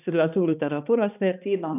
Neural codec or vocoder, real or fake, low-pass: codec, 16 kHz, 2 kbps, X-Codec, HuBERT features, trained on balanced general audio; fake; 3.6 kHz